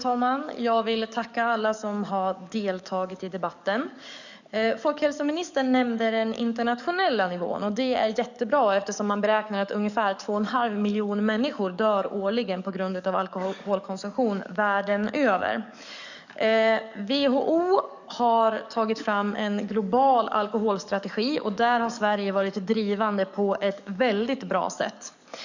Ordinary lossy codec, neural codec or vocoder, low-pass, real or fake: none; codec, 44.1 kHz, 7.8 kbps, DAC; 7.2 kHz; fake